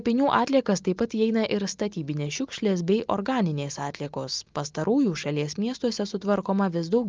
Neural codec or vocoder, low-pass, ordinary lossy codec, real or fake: none; 7.2 kHz; Opus, 24 kbps; real